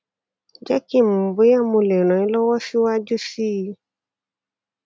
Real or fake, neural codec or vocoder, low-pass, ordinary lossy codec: real; none; none; none